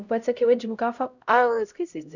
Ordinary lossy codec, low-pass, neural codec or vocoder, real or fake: none; 7.2 kHz; codec, 16 kHz, 0.5 kbps, X-Codec, HuBERT features, trained on LibriSpeech; fake